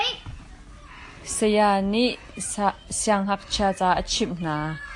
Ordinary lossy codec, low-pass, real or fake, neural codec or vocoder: AAC, 64 kbps; 10.8 kHz; real; none